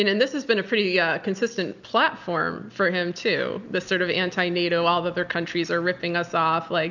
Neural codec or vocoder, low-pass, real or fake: none; 7.2 kHz; real